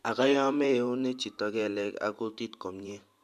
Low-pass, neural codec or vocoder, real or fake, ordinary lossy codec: 14.4 kHz; vocoder, 44.1 kHz, 128 mel bands every 512 samples, BigVGAN v2; fake; MP3, 96 kbps